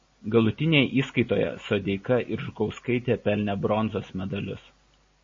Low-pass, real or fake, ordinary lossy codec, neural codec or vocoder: 7.2 kHz; real; MP3, 32 kbps; none